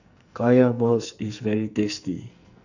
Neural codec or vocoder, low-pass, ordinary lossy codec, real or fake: codec, 44.1 kHz, 2.6 kbps, SNAC; 7.2 kHz; none; fake